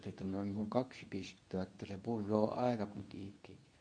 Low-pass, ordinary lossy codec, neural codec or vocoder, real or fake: 9.9 kHz; MP3, 64 kbps; codec, 24 kHz, 0.9 kbps, WavTokenizer, medium speech release version 2; fake